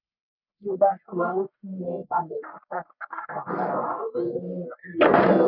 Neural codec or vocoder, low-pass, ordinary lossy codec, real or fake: codec, 44.1 kHz, 1.7 kbps, Pupu-Codec; 5.4 kHz; none; fake